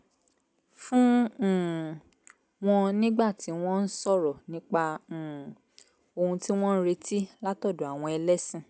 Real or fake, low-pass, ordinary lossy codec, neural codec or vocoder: real; none; none; none